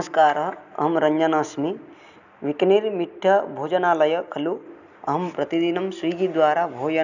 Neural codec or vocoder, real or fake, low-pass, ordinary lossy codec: none; real; 7.2 kHz; none